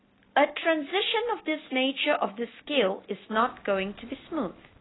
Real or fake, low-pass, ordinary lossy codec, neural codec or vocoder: real; 7.2 kHz; AAC, 16 kbps; none